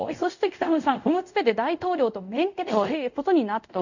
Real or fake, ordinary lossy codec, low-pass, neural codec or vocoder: fake; none; 7.2 kHz; codec, 24 kHz, 0.5 kbps, DualCodec